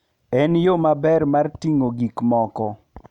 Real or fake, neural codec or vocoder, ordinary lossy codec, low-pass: real; none; none; 19.8 kHz